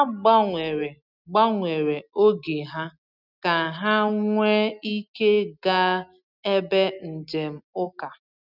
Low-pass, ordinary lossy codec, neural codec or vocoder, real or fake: 5.4 kHz; none; none; real